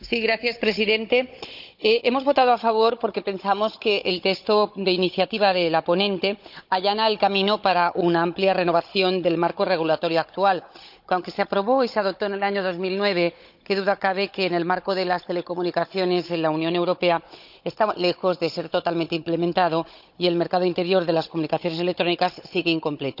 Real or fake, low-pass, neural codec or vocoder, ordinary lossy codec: fake; 5.4 kHz; codec, 16 kHz, 16 kbps, FunCodec, trained on Chinese and English, 50 frames a second; none